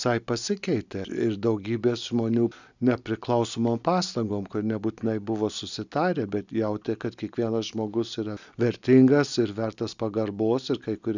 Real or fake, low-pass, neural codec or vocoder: real; 7.2 kHz; none